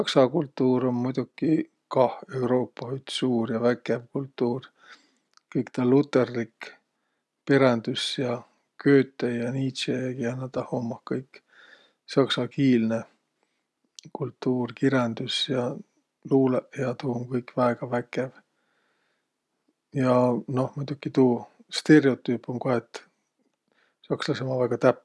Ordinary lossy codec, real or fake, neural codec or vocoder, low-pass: none; real; none; none